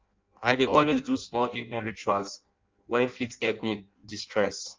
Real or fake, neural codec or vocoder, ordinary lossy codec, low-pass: fake; codec, 16 kHz in and 24 kHz out, 0.6 kbps, FireRedTTS-2 codec; Opus, 24 kbps; 7.2 kHz